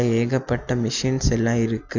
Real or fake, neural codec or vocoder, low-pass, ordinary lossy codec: real; none; 7.2 kHz; none